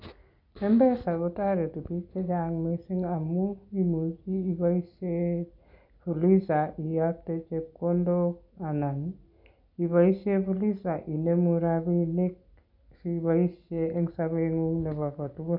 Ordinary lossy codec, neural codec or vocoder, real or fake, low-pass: none; none; real; 5.4 kHz